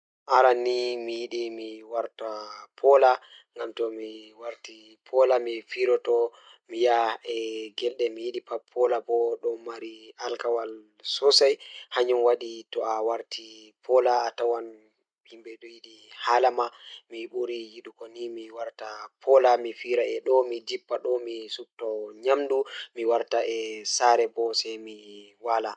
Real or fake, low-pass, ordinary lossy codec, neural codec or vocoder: real; 9.9 kHz; none; none